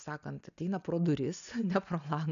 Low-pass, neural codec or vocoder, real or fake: 7.2 kHz; none; real